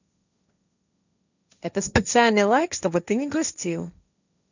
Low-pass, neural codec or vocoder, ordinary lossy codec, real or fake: 7.2 kHz; codec, 16 kHz, 1.1 kbps, Voila-Tokenizer; none; fake